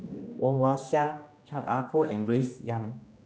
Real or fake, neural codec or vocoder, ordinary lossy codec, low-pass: fake; codec, 16 kHz, 1 kbps, X-Codec, HuBERT features, trained on general audio; none; none